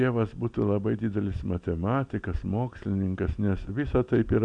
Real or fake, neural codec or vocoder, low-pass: real; none; 9.9 kHz